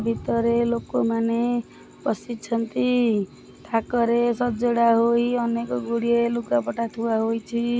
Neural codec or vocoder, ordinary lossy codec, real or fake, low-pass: none; none; real; none